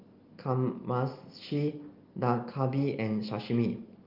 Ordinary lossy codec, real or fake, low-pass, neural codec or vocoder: Opus, 32 kbps; real; 5.4 kHz; none